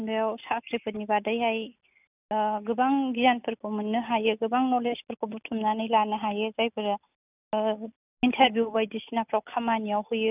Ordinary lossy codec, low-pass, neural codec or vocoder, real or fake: none; 3.6 kHz; none; real